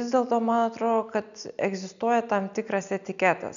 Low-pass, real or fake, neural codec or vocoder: 7.2 kHz; real; none